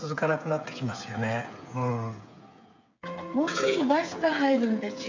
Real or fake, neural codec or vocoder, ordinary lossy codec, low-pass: fake; codec, 16 kHz, 8 kbps, FreqCodec, smaller model; MP3, 64 kbps; 7.2 kHz